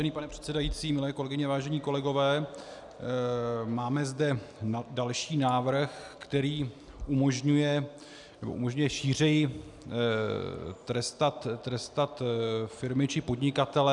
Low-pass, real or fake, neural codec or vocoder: 10.8 kHz; real; none